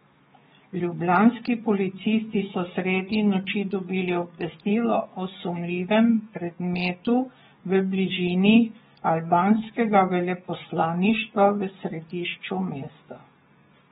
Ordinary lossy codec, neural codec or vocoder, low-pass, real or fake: AAC, 16 kbps; codec, 44.1 kHz, 7.8 kbps, DAC; 19.8 kHz; fake